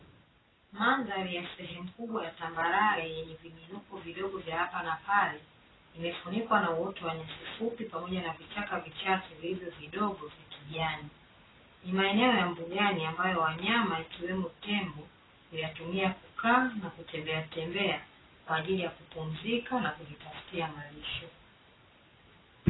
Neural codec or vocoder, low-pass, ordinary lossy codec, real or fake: none; 7.2 kHz; AAC, 16 kbps; real